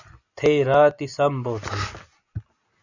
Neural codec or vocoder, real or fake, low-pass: none; real; 7.2 kHz